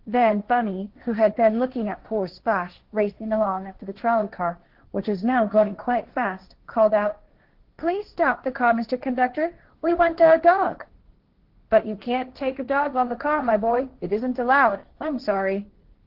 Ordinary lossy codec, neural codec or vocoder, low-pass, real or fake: Opus, 16 kbps; codec, 16 kHz, 1.1 kbps, Voila-Tokenizer; 5.4 kHz; fake